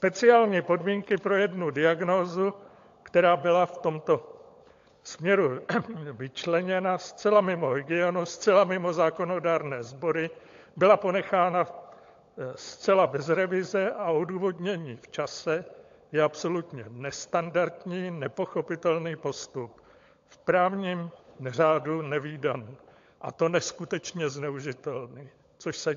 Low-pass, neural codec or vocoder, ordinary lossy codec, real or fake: 7.2 kHz; codec, 16 kHz, 16 kbps, FunCodec, trained on LibriTTS, 50 frames a second; AAC, 48 kbps; fake